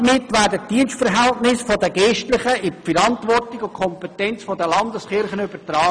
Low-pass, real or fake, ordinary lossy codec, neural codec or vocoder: 9.9 kHz; real; none; none